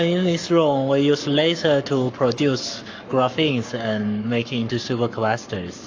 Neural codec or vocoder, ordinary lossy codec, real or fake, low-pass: codec, 44.1 kHz, 7.8 kbps, Pupu-Codec; AAC, 48 kbps; fake; 7.2 kHz